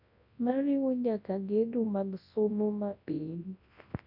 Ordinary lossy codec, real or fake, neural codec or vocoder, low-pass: none; fake; codec, 24 kHz, 0.9 kbps, WavTokenizer, large speech release; 5.4 kHz